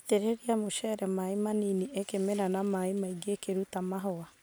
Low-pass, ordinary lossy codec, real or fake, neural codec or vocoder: none; none; real; none